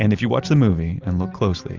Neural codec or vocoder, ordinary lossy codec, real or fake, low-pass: none; Opus, 32 kbps; real; 7.2 kHz